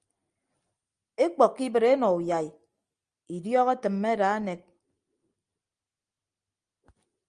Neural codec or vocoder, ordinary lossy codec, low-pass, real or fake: none; Opus, 32 kbps; 9.9 kHz; real